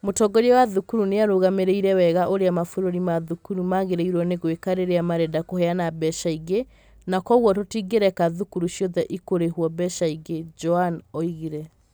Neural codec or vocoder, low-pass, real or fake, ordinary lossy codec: none; none; real; none